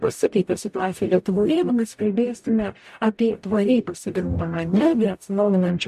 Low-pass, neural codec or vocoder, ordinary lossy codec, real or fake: 14.4 kHz; codec, 44.1 kHz, 0.9 kbps, DAC; MP3, 64 kbps; fake